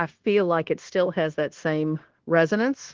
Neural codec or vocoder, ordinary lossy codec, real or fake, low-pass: codec, 16 kHz, 0.9 kbps, LongCat-Audio-Codec; Opus, 16 kbps; fake; 7.2 kHz